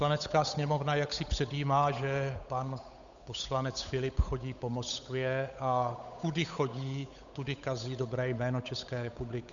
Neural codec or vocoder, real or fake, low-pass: codec, 16 kHz, 8 kbps, FunCodec, trained on Chinese and English, 25 frames a second; fake; 7.2 kHz